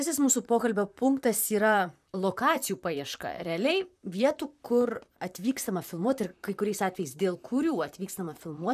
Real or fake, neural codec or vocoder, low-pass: fake; vocoder, 44.1 kHz, 128 mel bands, Pupu-Vocoder; 14.4 kHz